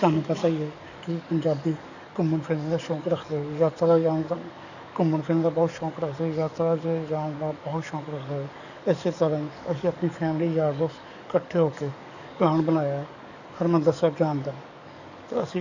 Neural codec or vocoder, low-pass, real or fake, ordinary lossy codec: codec, 44.1 kHz, 7.8 kbps, DAC; 7.2 kHz; fake; none